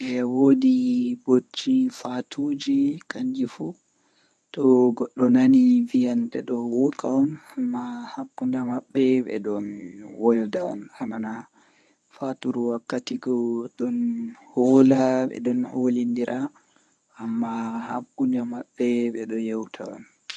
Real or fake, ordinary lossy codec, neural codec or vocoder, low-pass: fake; none; codec, 24 kHz, 0.9 kbps, WavTokenizer, medium speech release version 1; none